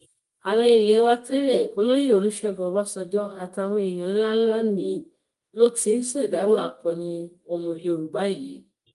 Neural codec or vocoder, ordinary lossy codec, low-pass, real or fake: codec, 24 kHz, 0.9 kbps, WavTokenizer, medium music audio release; Opus, 32 kbps; 10.8 kHz; fake